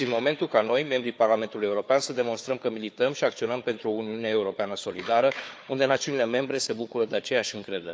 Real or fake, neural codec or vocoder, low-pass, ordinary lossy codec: fake; codec, 16 kHz, 4 kbps, FunCodec, trained on Chinese and English, 50 frames a second; none; none